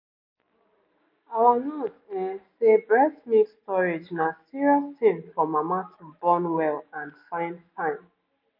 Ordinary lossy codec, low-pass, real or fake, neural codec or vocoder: MP3, 32 kbps; 5.4 kHz; real; none